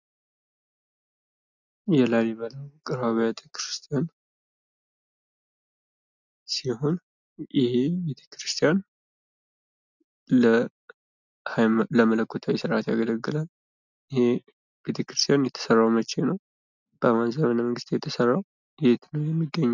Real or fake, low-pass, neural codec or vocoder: real; 7.2 kHz; none